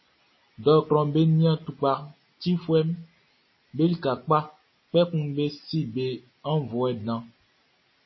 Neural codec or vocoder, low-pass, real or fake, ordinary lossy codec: none; 7.2 kHz; real; MP3, 24 kbps